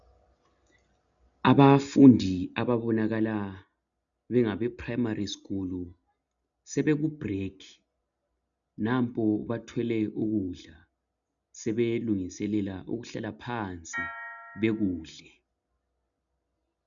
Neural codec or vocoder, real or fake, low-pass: none; real; 7.2 kHz